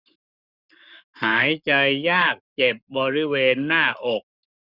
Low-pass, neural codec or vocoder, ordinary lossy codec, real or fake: 5.4 kHz; codec, 44.1 kHz, 7.8 kbps, Pupu-Codec; none; fake